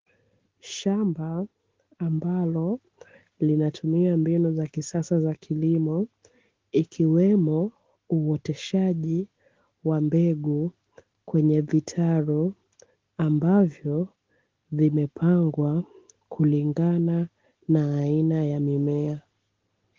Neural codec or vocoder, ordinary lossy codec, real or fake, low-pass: none; Opus, 16 kbps; real; 7.2 kHz